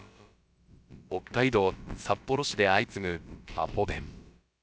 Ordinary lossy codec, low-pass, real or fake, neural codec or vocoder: none; none; fake; codec, 16 kHz, about 1 kbps, DyCAST, with the encoder's durations